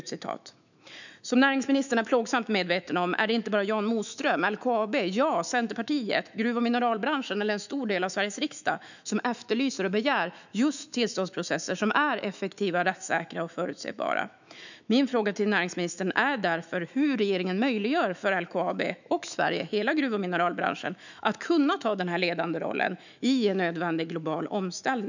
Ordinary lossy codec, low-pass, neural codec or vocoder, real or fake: none; 7.2 kHz; autoencoder, 48 kHz, 128 numbers a frame, DAC-VAE, trained on Japanese speech; fake